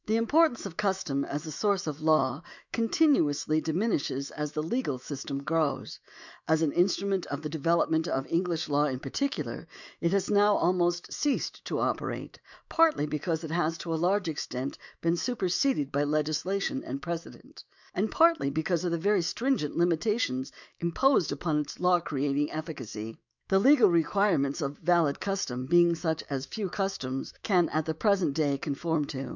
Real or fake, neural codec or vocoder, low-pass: fake; autoencoder, 48 kHz, 128 numbers a frame, DAC-VAE, trained on Japanese speech; 7.2 kHz